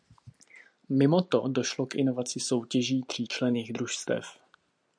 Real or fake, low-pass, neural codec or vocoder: real; 9.9 kHz; none